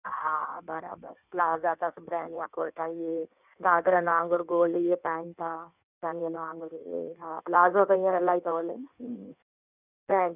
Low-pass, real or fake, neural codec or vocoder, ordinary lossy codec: 3.6 kHz; fake; codec, 16 kHz in and 24 kHz out, 1.1 kbps, FireRedTTS-2 codec; none